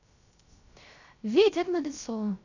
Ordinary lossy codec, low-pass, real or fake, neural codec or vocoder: none; 7.2 kHz; fake; codec, 16 kHz, 0.3 kbps, FocalCodec